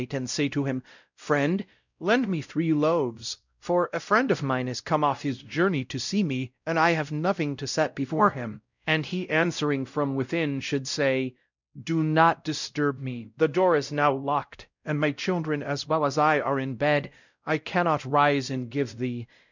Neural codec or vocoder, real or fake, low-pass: codec, 16 kHz, 0.5 kbps, X-Codec, WavLM features, trained on Multilingual LibriSpeech; fake; 7.2 kHz